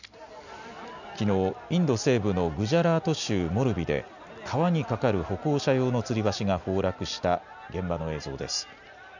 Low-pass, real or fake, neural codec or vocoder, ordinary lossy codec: 7.2 kHz; real; none; none